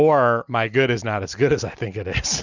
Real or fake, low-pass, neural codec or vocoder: real; 7.2 kHz; none